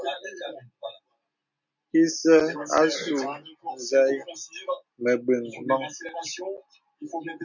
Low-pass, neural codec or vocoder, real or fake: 7.2 kHz; none; real